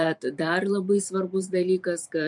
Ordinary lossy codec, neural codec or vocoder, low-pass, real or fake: MP3, 64 kbps; vocoder, 44.1 kHz, 128 mel bands every 512 samples, BigVGAN v2; 10.8 kHz; fake